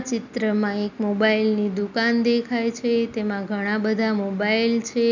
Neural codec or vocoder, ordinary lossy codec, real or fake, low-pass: none; none; real; 7.2 kHz